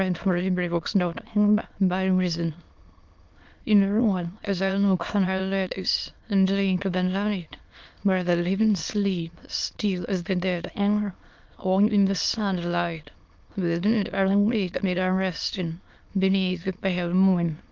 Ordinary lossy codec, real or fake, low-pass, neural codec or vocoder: Opus, 32 kbps; fake; 7.2 kHz; autoencoder, 22.05 kHz, a latent of 192 numbers a frame, VITS, trained on many speakers